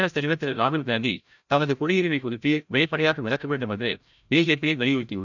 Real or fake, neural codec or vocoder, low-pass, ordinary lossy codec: fake; codec, 16 kHz, 0.5 kbps, FreqCodec, larger model; 7.2 kHz; none